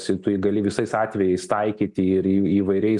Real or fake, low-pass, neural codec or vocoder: real; 10.8 kHz; none